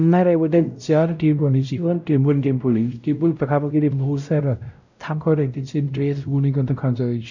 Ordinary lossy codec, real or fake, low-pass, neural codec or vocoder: none; fake; 7.2 kHz; codec, 16 kHz, 0.5 kbps, X-Codec, WavLM features, trained on Multilingual LibriSpeech